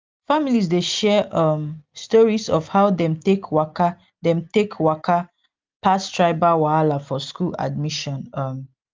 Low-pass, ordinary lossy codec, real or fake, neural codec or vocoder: 7.2 kHz; Opus, 24 kbps; real; none